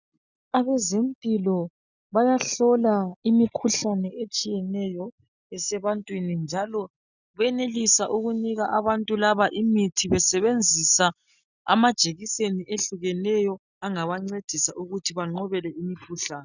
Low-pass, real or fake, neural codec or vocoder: 7.2 kHz; real; none